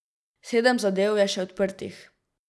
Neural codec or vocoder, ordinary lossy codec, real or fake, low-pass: none; none; real; none